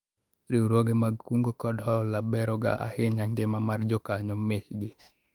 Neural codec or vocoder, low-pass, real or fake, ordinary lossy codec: autoencoder, 48 kHz, 32 numbers a frame, DAC-VAE, trained on Japanese speech; 19.8 kHz; fake; Opus, 32 kbps